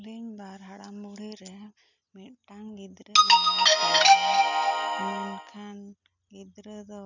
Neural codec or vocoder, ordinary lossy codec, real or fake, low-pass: none; none; real; 7.2 kHz